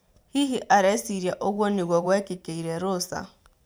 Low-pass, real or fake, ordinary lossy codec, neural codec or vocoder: none; fake; none; vocoder, 44.1 kHz, 128 mel bands every 512 samples, BigVGAN v2